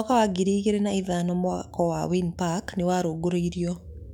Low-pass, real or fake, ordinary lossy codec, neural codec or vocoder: 19.8 kHz; fake; none; autoencoder, 48 kHz, 128 numbers a frame, DAC-VAE, trained on Japanese speech